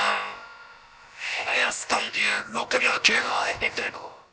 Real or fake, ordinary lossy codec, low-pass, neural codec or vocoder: fake; none; none; codec, 16 kHz, about 1 kbps, DyCAST, with the encoder's durations